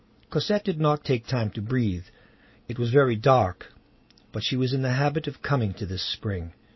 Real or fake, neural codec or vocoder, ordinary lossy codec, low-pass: real; none; MP3, 24 kbps; 7.2 kHz